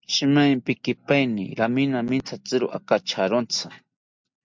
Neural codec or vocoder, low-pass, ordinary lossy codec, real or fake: none; 7.2 kHz; MP3, 64 kbps; real